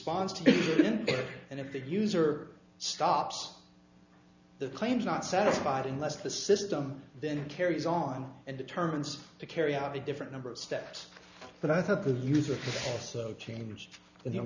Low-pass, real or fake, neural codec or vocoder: 7.2 kHz; real; none